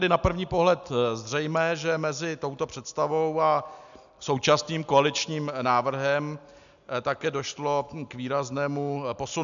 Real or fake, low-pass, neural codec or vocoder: real; 7.2 kHz; none